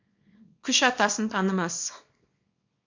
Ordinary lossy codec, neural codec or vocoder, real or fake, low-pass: MP3, 48 kbps; codec, 24 kHz, 0.9 kbps, WavTokenizer, small release; fake; 7.2 kHz